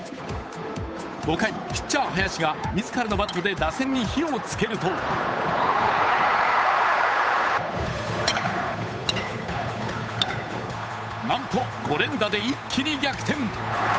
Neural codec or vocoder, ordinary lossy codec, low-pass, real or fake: codec, 16 kHz, 8 kbps, FunCodec, trained on Chinese and English, 25 frames a second; none; none; fake